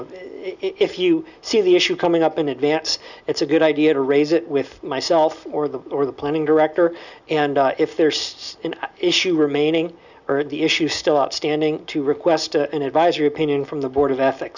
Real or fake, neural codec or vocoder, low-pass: real; none; 7.2 kHz